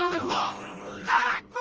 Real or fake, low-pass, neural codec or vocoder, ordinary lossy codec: fake; 7.2 kHz; codec, 16 kHz, 1 kbps, FreqCodec, smaller model; Opus, 24 kbps